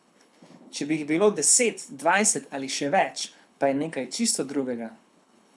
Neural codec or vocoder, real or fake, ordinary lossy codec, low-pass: codec, 24 kHz, 6 kbps, HILCodec; fake; none; none